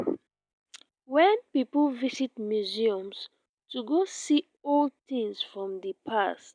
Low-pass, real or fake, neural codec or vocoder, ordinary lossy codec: 9.9 kHz; real; none; none